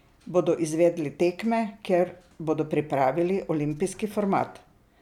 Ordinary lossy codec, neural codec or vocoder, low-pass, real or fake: none; none; 19.8 kHz; real